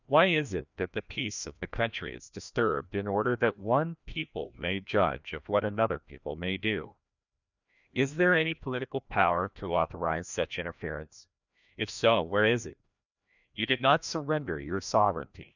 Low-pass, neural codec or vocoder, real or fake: 7.2 kHz; codec, 16 kHz, 1 kbps, FreqCodec, larger model; fake